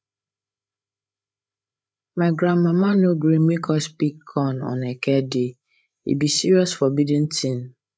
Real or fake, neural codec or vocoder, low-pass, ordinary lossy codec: fake; codec, 16 kHz, 8 kbps, FreqCodec, larger model; none; none